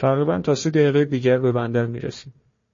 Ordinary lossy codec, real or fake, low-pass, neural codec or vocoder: MP3, 32 kbps; fake; 7.2 kHz; codec, 16 kHz, 1 kbps, FunCodec, trained on Chinese and English, 50 frames a second